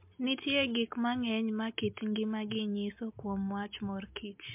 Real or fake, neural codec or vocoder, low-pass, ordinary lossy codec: real; none; 3.6 kHz; MP3, 32 kbps